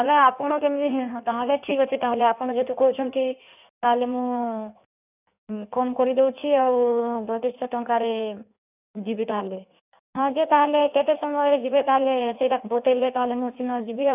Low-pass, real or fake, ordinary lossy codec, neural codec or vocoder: 3.6 kHz; fake; none; codec, 16 kHz in and 24 kHz out, 1.1 kbps, FireRedTTS-2 codec